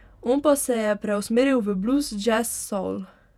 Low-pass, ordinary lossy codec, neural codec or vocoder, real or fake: 19.8 kHz; none; vocoder, 48 kHz, 128 mel bands, Vocos; fake